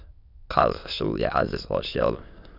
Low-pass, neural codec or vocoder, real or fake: 5.4 kHz; autoencoder, 22.05 kHz, a latent of 192 numbers a frame, VITS, trained on many speakers; fake